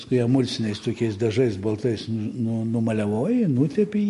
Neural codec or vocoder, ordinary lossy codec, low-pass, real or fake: none; MP3, 48 kbps; 14.4 kHz; real